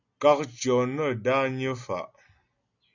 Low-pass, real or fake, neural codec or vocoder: 7.2 kHz; real; none